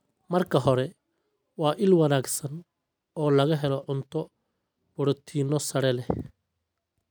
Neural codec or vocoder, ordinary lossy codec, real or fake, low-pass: none; none; real; none